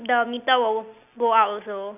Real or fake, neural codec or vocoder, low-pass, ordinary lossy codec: real; none; 3.6 kHz; none